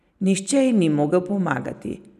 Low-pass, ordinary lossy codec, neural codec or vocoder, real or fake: 14.4 kHz; none; none; real